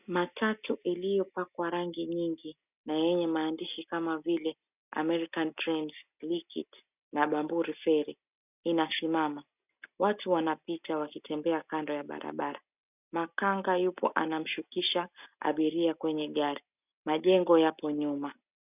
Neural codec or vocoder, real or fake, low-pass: none; real; 3.6 kHz